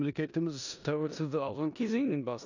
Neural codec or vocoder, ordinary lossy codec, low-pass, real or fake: codec, 16 kHz in and 24 kHz out, 0.9 kbps, LongCat-Audio-Codec, four codebook decoder; none; 7.2 kHz; fake